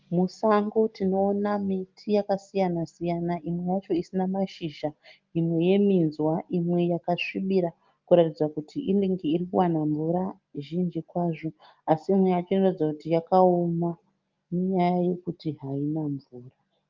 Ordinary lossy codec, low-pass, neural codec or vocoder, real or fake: Opus, 32 kbps; 7.2 kHz; none; real